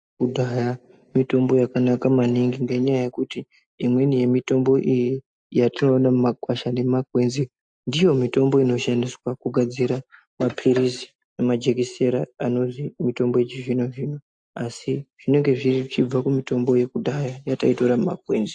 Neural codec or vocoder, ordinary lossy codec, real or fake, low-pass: none; AAC, 64 kbps; real; 9.9 kHz